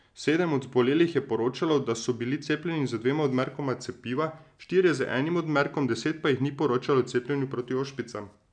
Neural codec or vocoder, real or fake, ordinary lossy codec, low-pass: none; real; none; 9.9 kHz